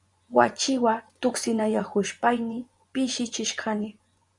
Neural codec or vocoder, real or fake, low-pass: vocoder, 24 kHz, 100 mel bands, Vocos; fake; 10.8 kHz